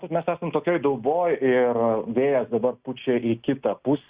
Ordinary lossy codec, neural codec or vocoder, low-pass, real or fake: Opus, 64 kbps; none; 3.6 kHz; real